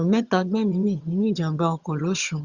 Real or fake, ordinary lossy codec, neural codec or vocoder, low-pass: fake; Opus, 64 kbps; vocoder, 22.05 kHz, 80 mel bands, HiFi-GAN; 7.2 kHz